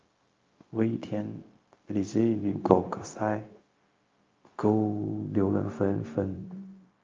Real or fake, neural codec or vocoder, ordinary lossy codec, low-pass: fake; codec, 16 kHz, 0.4 kbps, LongCat-Audio-Codec; Opus, 24 kbps; 7.2 kHz